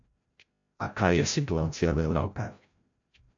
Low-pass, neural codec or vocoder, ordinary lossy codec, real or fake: 7.2 kHz; codec, 16 kHz, 0.5 kbps, FreqCodec, larger model; AAC, 64 kbps; fake